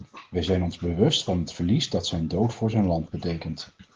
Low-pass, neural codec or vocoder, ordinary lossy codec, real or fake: 7.2 kHz; none; Opus, 16 kbps; real